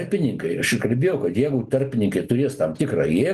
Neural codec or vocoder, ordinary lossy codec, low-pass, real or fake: none; Opus, 24 kbps; 14.4 kHz; real